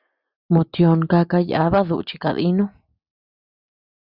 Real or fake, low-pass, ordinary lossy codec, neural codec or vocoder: real; 5.4 kHz; Opus, 64 kbps; none